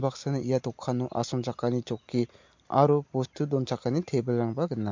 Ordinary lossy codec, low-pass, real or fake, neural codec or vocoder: MP3, 48 kbps; 7.2 kHz; real; none